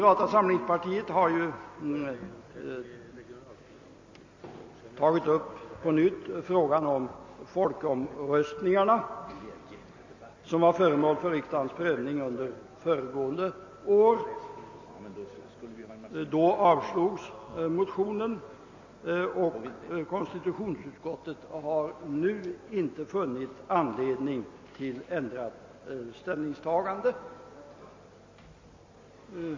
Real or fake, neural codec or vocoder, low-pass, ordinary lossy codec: real; none; 7.2 kHz; MP3, 32 kbps